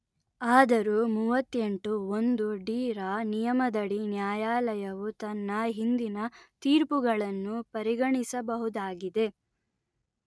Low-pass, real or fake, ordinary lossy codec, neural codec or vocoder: none; real; none; none